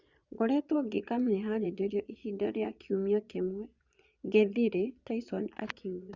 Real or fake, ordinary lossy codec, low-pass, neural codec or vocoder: fake; Opus, 64 kbps; 7.2 kHz; vocoder, 22.05 kHz, 80 mel bands, Vocos